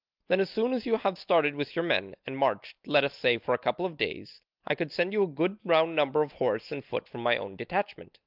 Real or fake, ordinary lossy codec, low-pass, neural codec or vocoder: real; Opus, 24 kbps; 5.4 kHz; none